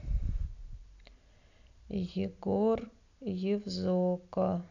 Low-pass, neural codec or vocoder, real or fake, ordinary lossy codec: 7.2 kHz; none; real; none